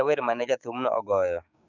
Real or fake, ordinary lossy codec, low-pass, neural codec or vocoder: fake; none; 7.2 kHz; codec, 16 kHz, 6 kbps, DAC